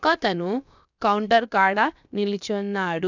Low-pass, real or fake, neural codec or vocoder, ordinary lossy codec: 7.2 kHz; fake; codec, 16 kHz, about 1 kbps, DyCAST, with the encoder's durations; none